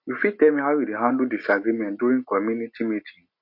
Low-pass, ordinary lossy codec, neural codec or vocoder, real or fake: 5.4 kHz; MP3, 32 kbps; none; real